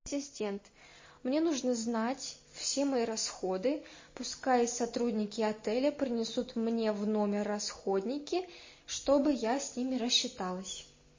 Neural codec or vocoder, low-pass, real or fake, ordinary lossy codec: none; 7.2 kHz; real; MP3, 32 kbps